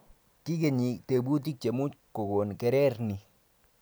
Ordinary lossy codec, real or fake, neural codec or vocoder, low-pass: none; real; none; none